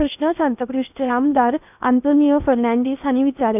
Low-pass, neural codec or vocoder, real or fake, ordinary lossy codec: 3.6 kHz; codec, 16 kHz in and 24 kHz out, 0.8 kbps, FocalCodec, streaming, 65536 codes; fake; none